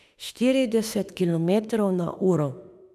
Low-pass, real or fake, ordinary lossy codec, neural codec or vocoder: 14.4 kHz; fake; none; autoencoder, 48 kHz, 32 numbers a frame, DAC-VAE, trained on Japanese speech